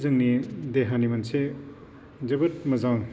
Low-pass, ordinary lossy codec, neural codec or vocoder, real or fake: none; none; none; real